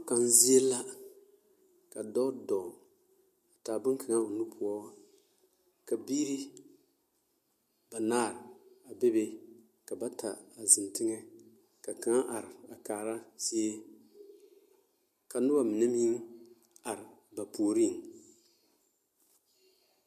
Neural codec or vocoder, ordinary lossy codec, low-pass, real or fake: none; MP3, 64 kbps; 14.4 kHz; real